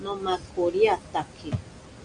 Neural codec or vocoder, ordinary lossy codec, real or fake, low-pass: none; MP3, 96 kbps; real; 9.9 kHz